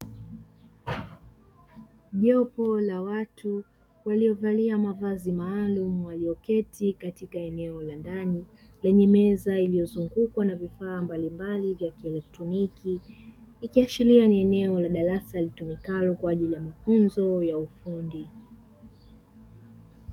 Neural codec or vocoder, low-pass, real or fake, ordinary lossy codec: codec, 44.1 kHz, 7.8 kbps, DAC; 19.8 kHz; fake; MP3, 96 kbps